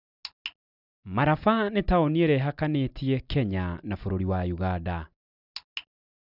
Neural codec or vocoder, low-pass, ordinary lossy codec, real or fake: none; 5.4 kHz; none; real